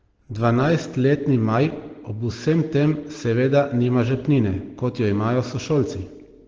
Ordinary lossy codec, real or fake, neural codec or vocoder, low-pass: Opus, 16 kbps; real; none; 7.2 kHz